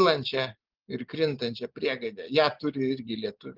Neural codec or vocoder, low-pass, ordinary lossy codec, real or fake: none; 5.4 kHz; Opus, 32 kbps; real